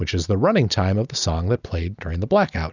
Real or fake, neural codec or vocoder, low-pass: real; none; 7.2 kHz